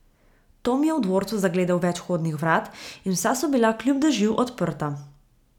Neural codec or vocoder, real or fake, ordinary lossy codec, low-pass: none; real; none; 19.8 kHz